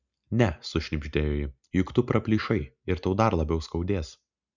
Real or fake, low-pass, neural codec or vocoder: real; 7.2 kHz; none